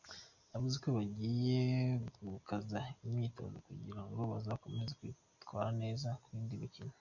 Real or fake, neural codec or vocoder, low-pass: real; none; 7.2 kHz